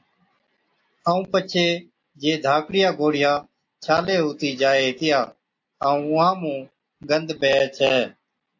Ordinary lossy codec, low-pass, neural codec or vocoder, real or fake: AAC, 48 kbps; 7.2 kHz; none; real